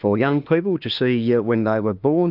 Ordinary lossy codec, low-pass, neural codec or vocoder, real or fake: Opus, 24 kbps; 5.4 kHz; autoencoder, 48 kHz, 32 numbers a frame, DAC-VAE, trained on Japanese speech; fake